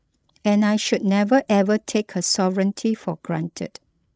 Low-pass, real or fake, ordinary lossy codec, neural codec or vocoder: none; fake; none; codec, 16 kHz, 16 kbps, FreqCodec, larger model